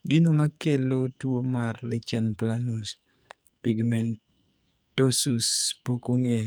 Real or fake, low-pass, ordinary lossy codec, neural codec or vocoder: fake; none; none; codec, 44.1 kHz, 2.6 kbps, SNAC